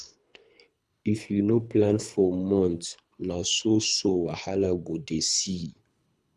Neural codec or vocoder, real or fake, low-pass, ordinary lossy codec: codec, 24 kHz, 3 kbps, HILCodec; fake; none; none